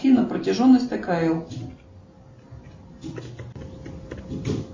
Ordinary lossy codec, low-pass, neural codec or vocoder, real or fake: MP3, 32 kbps; 7.2 kHz; none; real